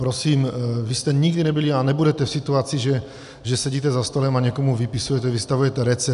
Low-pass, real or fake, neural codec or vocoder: 10.8 kHz; real; none